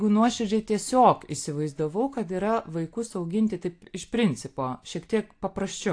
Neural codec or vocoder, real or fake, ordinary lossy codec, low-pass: none; real; AAC, 48 kbps; 9.9 kHz